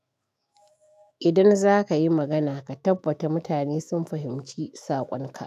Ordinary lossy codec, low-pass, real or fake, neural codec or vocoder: none; 14.4 kHz; fake; autoencoder, 48 kHz, 128 numbers a frame, DAC-VAE, trained on Japanese speech